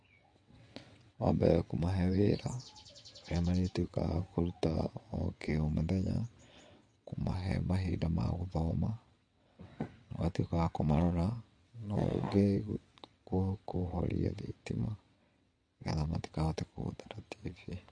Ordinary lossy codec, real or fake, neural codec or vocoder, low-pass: MP3, 48 kbps; fake; vocoder, 22.05 kHz, 80 mel bands, WaveNeXt; 9.9 kHz